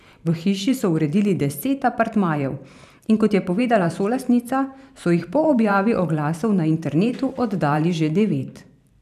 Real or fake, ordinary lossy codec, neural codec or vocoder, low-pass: fake; none; vocoder, 44.1 kHz, 128 mel bands every 512 samples, BigVGAN v2; 14.4 kHz